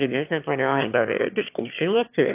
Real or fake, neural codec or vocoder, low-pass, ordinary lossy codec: fake; autoencoder, 22.05 kHz, a latent of 192 numbers a frame, VITS, trained on one speaker; 3.6 kHz; AAC, 24 kbps